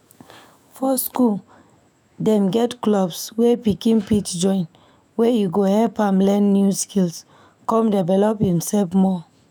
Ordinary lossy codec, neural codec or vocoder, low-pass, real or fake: none; autoencoder, 48 kHz, 128 numbers a frame, DAC-VAE, trained on Japanese speech; none; fake